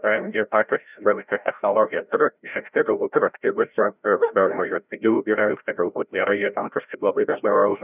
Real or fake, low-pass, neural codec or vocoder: fake; 3.6 kHz; codec, 16 kHz, 0.5 kbps, FreqCodec, larger model